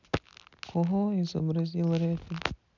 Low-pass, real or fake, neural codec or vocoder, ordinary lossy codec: 7.2 kHz; real; none; none